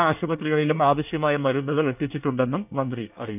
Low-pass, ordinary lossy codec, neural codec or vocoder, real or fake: 3.6 kHz; none; codec, 24 kHz, 1 kbps, SNAC; fake